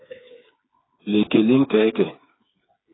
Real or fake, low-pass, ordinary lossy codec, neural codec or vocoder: fake; 7.2 kHz; AAC, 16 kbps; codec, 16 kHz, 4 kbps, FreqCodec, smaller model